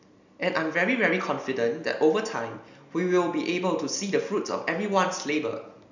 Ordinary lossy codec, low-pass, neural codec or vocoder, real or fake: none; 7.2 kHz; none; real